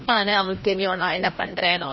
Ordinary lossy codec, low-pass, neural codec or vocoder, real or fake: MP3, 24 kbps; 7.2 kHz; codec, 16 kHz, 1 kbps, FreqCodec, larger model; fake